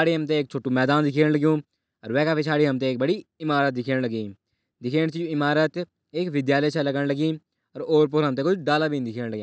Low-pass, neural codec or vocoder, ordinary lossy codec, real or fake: none; none; none; real